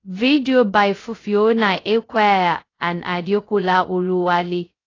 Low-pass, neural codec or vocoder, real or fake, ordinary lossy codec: 7.2 kHz; codec, 16 kHz, 0.2 kbps, FocalCodec; fake; AAC, 32 kbps